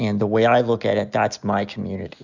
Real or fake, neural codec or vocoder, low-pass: real; none; 7.2 kHz